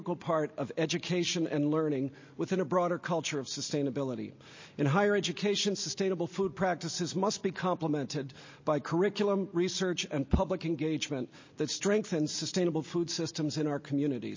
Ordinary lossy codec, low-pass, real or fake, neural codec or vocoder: MP3, 32 kbps; 7.2 kHz; real; none